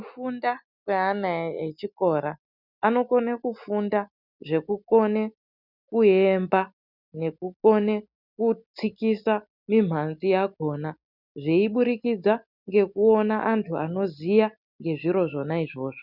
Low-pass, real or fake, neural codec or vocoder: 5.4 kHz; real; none